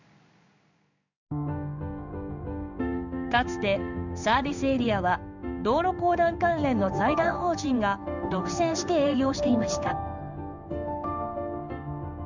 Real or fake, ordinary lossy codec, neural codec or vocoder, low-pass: fake; none; codec, 16 kHz in and 24 kHz out, 1 kbps, XY-Tokenizer; 7.2 kHz